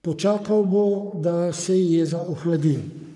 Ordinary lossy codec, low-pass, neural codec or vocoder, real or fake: none; 10.8 kHz; codec, 44.1 kHz, 3.4 kbps, Pupu-Codec; fake